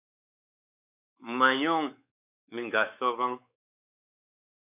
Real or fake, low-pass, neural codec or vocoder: fake; 3.6 kHz; codec, 16 kHz, 4 kbps, X-Codec, WavLM features, trained on Multilingual LibriSpeech